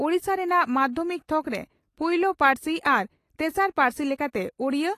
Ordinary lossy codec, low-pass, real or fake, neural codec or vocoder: AAC, 48 kbps; 14.4 kHz; real; none